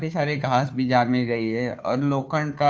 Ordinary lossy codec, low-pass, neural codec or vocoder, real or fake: none; none; codec, 16 kHz, 2 kbps, FunCodec, trained on Chinese and English, 25 frames a second; fake